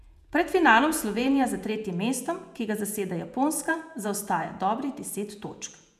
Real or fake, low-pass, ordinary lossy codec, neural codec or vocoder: fake; 14.4 kHz; none; vocoder, 48 kHz, 128 mel bands, Vocos